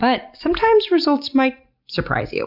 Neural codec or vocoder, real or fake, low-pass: none; real; 5.4 kHz